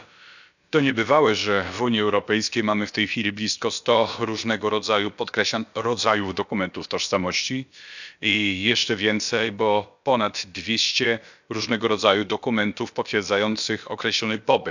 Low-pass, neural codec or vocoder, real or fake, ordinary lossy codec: 7.2 kHz; codec, 16 kHz, about 1 kbps, DyCAST, with the encoder's durations; fake; none